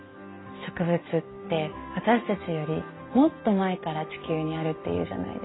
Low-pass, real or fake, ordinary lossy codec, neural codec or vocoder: 7.2 kHz; real; AAC, 16 kbps; none